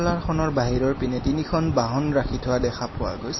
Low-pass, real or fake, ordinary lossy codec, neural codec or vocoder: 7.2 kHz; fake; MP3, 24 kbps; vocoder, 44.1 kHz, 128 mel bands every 256 samples, BigVGAN v2